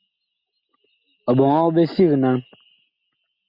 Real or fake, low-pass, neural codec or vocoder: real; 5.4 kHz; none